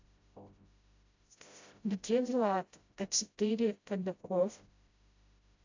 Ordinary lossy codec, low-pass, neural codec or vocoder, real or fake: AAC, 48 kbps; 7.2 kHz; codec, 16 kHz, 0.5 kbps, FreqCodec, smaller model; fake